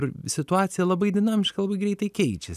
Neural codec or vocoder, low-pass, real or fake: none; 14.4 kHz; real